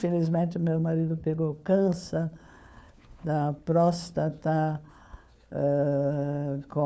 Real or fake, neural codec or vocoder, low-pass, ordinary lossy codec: fake; codec, 16 kHz, 4 kbps, FunCodec, trained on LibriTTS, 50 frames a second; none; none